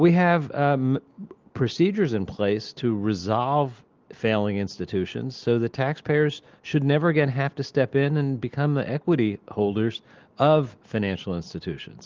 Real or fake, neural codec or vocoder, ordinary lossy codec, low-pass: real; none; Opus, 32 kbps; 7.2 kHz